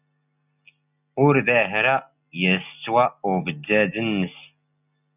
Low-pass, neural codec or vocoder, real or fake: 3.6 kHz; none; real